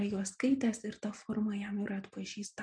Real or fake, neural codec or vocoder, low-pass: real; none; 9.9 kHz